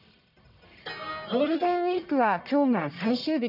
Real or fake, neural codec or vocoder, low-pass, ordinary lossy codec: fake; codec, 44.1 kHz, 1.7 kbps, Pupu-Codec; 5.4 kHz; none